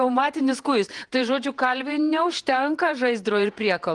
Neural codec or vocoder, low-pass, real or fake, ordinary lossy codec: vocoder, 48 kHz, 128 mel bands, Vocos; 10.8 kHz; fake; Opus, 24 kbps